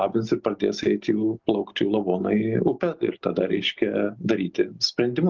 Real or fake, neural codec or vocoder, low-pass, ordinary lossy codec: real; none; 7.2 kHz; Opus, 32 kbps